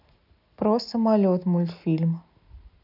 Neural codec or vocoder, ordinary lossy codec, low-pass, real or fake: none; none; 5.4 kHz; real